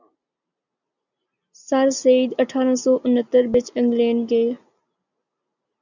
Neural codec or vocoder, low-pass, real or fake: none; 7.2 kHz; real